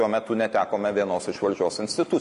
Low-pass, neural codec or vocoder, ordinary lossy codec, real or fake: 10.8 kHz; none; MP3, 48 kbps; real